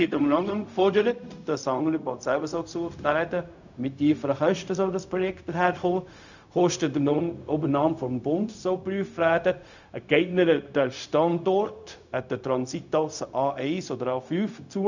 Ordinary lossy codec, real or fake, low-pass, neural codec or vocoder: none; fake; 7.2 kHz; codec, 16 kHz, 0.4 kbps, LongCat-Audio-Codec